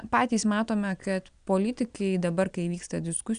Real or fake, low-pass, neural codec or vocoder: real; 9.9 kHz; none